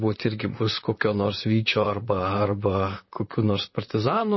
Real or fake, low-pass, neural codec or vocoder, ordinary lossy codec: fake; 7.2 kHz; vocoder, 44.1 kHz, 128 mel bands, Pupu-Vocoder; MP3, 24 kbps